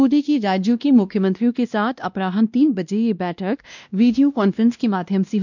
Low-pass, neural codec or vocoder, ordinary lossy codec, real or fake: 7.2 kHz; codec, 16 kHz, 1 kbps, X-Codec, WavLM features, trained on Multilingual LibriSpeech; none; fake